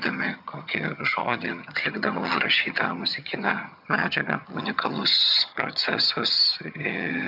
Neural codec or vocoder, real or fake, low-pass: vocoder, 22.05 kHz, 80 mel bands, HiFi-GAN; fake; 5.4 kHz